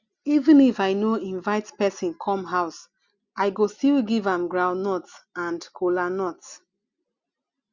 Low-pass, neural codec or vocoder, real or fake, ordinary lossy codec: 7.2 kHz; none; real; Opus, 64 kbps